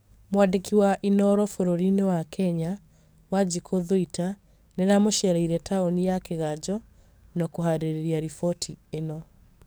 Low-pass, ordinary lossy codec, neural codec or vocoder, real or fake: none; none; codec, 44.1 kHz, 7.8 kbps, DAC; fake